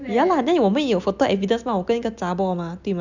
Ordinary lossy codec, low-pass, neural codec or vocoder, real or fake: none; 7.2 kHz; none; real